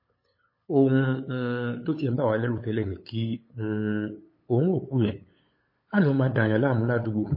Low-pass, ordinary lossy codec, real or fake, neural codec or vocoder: 5.4 kHz; MP3, 24 kbps; fake; codec, 16 kHz, 8 kbps, FunCodec, trained on LibriTTS, 25 frames a second